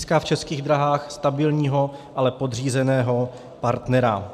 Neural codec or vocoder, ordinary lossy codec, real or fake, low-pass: none; MP3, 96 kbps; real; 14.4 kHz